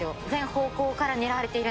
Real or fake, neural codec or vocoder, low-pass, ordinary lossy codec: real; none; none; none